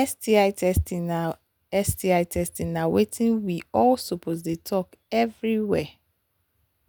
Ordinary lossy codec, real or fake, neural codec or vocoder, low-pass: none; real; none; none